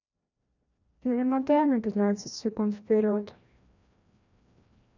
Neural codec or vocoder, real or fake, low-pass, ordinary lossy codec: codec, 16 kHz, 1 kbps, FreqCodec, larger model; fake; 7.2 kHz; none